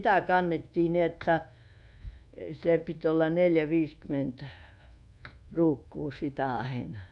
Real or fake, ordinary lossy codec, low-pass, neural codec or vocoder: fake; none; 10.8 kHz; codec, 24 kHz, 1.2 kbps, DualCodec